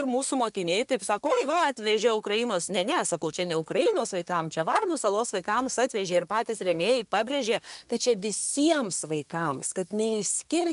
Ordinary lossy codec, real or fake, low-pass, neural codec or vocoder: MP3, 96 kbps; fake; 10.8 kHz; codec, 24 kHz, 1 kbps, SNAC